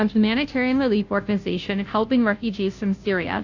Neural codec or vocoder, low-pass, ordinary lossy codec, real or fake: codec, 16 kHz, 0.5 kbps, FunCodec, trained on Chinese and English, 25 frames a second; 7.2 kHz; AAC, 48 kbps; fake